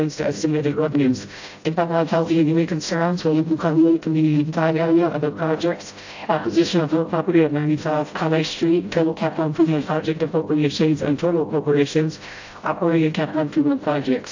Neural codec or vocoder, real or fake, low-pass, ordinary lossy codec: codec, 16 kHz, 0.5 kbps, FreqCodec, smaller model; fake; 7.2 kHz; AAC, 48 kbps